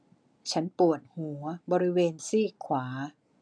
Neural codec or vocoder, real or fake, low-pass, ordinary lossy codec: none; real; 9.9 kHz; none